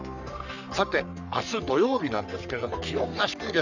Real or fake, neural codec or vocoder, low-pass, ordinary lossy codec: fake; codec, 44.1 kHz, 3.4 kbps, Pupu-Codec; 7.2 kHz; none